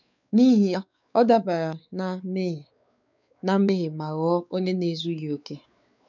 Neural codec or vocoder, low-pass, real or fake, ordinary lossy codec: codec, 16 kHz, 4 kbps, X-Codec, WavLM features, trained on Multilingual LibriSpeech; 7.2 kHz; fake; none